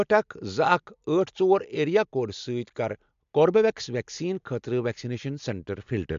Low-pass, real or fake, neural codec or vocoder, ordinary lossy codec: 7.2 kHz; real; none; MP3, 64 kbps